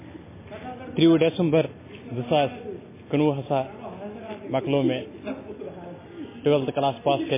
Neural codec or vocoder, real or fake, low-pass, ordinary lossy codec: none; real; 3.6 kHz; MP3, 16 kbps